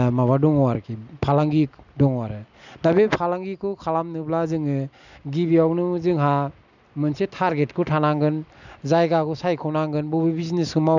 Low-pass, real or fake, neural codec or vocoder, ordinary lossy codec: 7.2 kHz; real; none; none